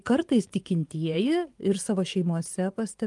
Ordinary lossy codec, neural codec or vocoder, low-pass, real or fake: Opus, 32 kbps; vocoder, 24 kHz, 100 mel bands, Vocos; 10.8 kHz; fake